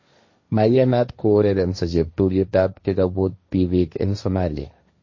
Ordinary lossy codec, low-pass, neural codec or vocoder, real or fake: MP3, 32 kbps; 7.2 kHz; codec, 16 kHz, 1.1 kbps, Voila-Tokenizer; fake